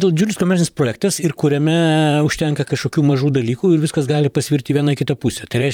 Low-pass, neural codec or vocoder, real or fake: 19.8 kHz; vocoder, 44.1 kHz, 128 mel bands, Pupu-Vocoder; fake